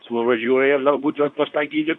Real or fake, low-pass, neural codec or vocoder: fake; 10.8 kHz; codec, 24 kHz, 0.9 kbps, WavTokenizer, medium speech release version 2